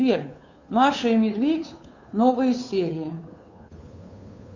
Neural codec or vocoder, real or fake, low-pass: codec, 16 kHz, 2 kbps, FunCodec, trained on Chinese and English, 25 frames a second; fake; 7.2 kHz